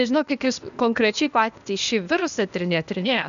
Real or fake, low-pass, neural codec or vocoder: fake; 7.2 kHz; codec, 16 kHz, 0.8 kbps, ZipCodec